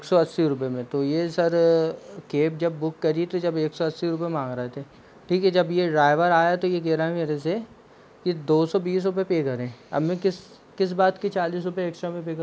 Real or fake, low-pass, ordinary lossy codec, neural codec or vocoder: real; none; none; none